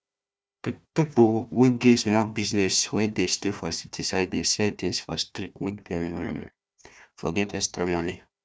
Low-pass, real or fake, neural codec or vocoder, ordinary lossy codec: none; fake; codec, 16 kHz, 1 kbps, FunCodec, trained on Chinese and English, 50 frames a second; none